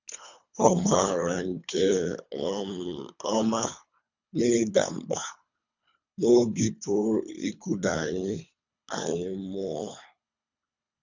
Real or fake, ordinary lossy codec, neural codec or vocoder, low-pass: fake; none; codec, 24 kHz, 3 kbps, HILCodec; 7.2 kHz